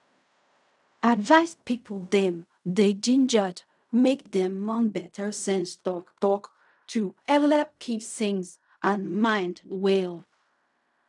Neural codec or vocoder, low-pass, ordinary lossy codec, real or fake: codec, 16 kHz in and 24 kHz out, 0.4 kbps, LongCat-Audio-Codec, fine tuned four codebook decoder; 10.8 kHz; none; fake